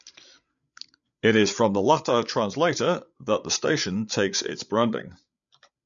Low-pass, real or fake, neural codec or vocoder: 7.2 kHz; fake; codec, 16 kHz, 8 kbps, FreqCodec, larger model